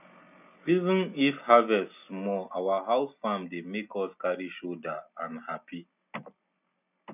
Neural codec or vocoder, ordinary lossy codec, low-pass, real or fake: none; none; 3.6 kHz; real